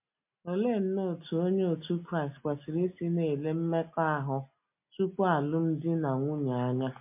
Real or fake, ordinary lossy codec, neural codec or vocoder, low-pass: real; MP3, 32 kbps; none; 3.6 kHz